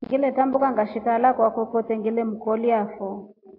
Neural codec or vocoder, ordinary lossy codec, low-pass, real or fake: none; AAC, 32 kbps; 5.4 kHz; real